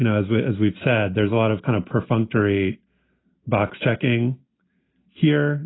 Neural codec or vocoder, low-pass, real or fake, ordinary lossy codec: none; 7.2 kHz; real; AAC, 16 kbps